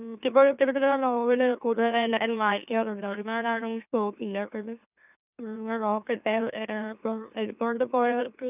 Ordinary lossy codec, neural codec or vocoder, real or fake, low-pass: none; autoencoder, 44.1 kHz, a latent of 192 numbers a frame, MeloTTS; fake; 3.6 kHz